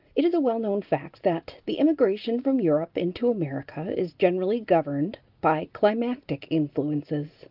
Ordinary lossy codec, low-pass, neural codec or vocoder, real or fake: Opus, 32 kbps; 5.4 kHz; none; real